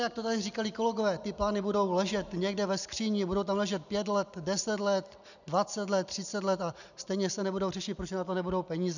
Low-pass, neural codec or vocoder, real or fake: 7.2 kHz; none; real